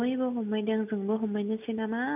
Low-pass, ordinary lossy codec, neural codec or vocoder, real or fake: 3.6 kHz; none; none; real